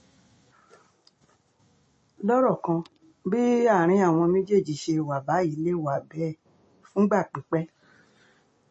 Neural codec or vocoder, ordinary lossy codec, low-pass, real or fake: none; MP3, 32 kbps; 10.8 kHz; real